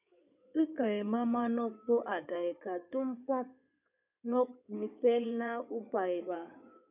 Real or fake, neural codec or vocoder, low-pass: fake; codec, 16 kHz in and 24 kHz out, 2.2 kbps, FireRedTTS-2 codec; 3.6 kHz